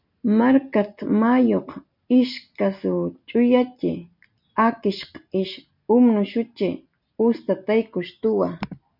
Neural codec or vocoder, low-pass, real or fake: none; 5.4 kHz; real